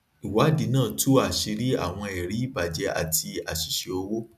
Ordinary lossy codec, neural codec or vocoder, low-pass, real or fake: none; none; 14.4 kHz; real